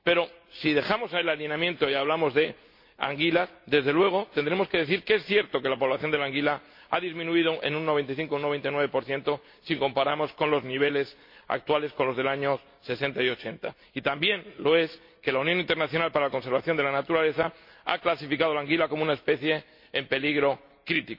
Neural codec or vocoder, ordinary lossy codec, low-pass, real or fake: none; MP3, 32 kbps; 5.4 kHz; real